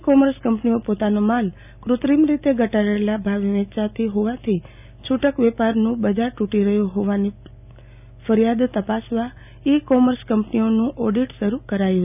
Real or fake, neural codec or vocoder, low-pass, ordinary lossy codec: real; none; 3.6 kHz; none